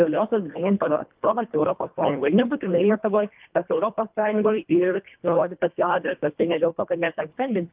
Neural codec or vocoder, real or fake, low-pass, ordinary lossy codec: codec, 24 kHz, 1.5 kbps, HILCodec; fake; 3.6 kHz; Opus, 24 kbps